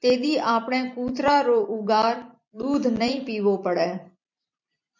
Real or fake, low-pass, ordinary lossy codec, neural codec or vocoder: fake; 7.2 kHz; MP3, 64 kbps; vocoder, 44.1 kHz, 128 mel bands every 256 samples, BigVGAN v2